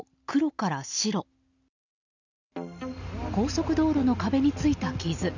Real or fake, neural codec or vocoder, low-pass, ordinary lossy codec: real; none; 7.2 kHz; none